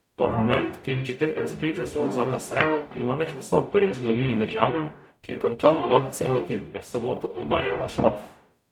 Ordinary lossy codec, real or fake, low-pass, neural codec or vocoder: none; fake; 19.8 kHz; codec, 44.1 kHz, 0.9 kbps, DAC